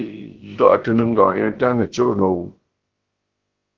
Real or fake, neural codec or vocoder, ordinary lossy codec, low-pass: fake; codec, 16 kHz, about 1 kbps, DyCAST, with the encoder's durations; Opus, 16 kbps; 7.2 kHz